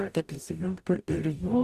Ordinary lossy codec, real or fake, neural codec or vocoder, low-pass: Opus, 64 kbps; fake; codec, 44.1 kHz, 0.9 kbps, DAC; 14.4 kHz